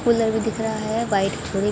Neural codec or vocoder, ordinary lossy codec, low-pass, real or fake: none; none; none; real